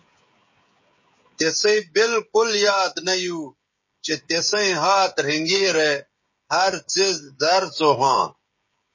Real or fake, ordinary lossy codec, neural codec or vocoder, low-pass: fake; MP3, 32 kbps; codec, 16 kHz, 16 kbps, FreqCodec, smaller model; 7.2 kHz